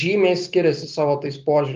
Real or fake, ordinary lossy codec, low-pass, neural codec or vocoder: real; Opus, 32 kbps; 7.2 kHz; none